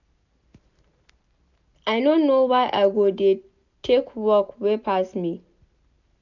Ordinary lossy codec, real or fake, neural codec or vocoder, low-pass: none; real; none; 7.2 kHz